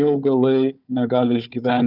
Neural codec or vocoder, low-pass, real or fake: codec, 16 kHz, 4 kbps, FunCodec, trained on Chinese and English, 50 frames a second; 5.4 kHz; fake